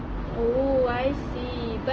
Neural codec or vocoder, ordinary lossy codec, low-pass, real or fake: none; Opus, 24 kbps; 7.2 kHz; real